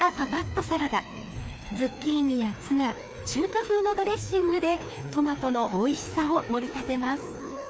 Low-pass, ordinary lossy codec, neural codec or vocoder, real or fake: none; none; codec, 16 kHz, 2 kbps, FreqCodec, larger model; fake